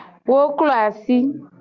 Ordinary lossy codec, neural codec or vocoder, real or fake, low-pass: Opus, 64 kbps; none; real; 7.2 kHz